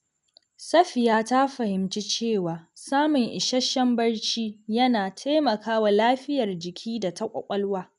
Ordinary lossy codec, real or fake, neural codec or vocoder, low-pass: none; real; none; 10.8 kHz